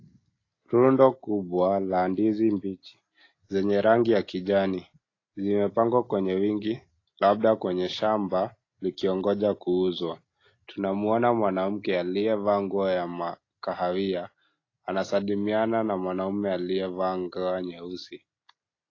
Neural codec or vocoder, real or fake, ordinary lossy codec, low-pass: none; real; AAC, 32 kbps; 7.2 kHz